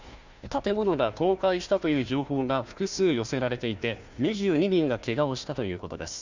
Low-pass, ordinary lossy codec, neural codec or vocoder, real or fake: 7.2 kHz; Opus, 64 kbps; codec, 16 kHz, 1 kbps, FunCodec, trained on Chinese and English, 50 frames a second; fake